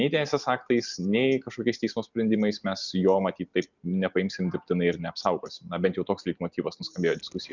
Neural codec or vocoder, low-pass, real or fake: none; 7.2 kHz; real